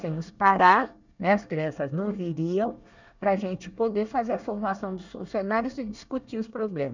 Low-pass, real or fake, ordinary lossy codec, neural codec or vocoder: 7.2 kHz; fake; none; codec, 24 kHz, 1 kbps, SNAC